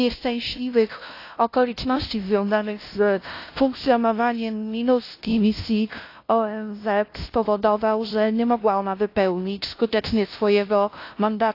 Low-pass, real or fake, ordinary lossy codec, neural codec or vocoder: 5.4 kHz; fake; AAC, 32 kbps; codec, 16 kHz, 0.5 kbps, FunCodec, trained on LibriTTS, 25 frames a second